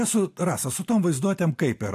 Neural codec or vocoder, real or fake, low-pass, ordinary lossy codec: none; real; 14.4 kHz; AAC, 64 kbps